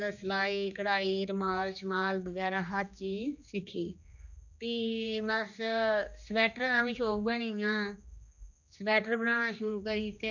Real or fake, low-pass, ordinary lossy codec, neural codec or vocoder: fake; 7.2 kHz; none; codec, 16 kHz, 2 kbps, X-Codec, HuBERT features, trained on general audio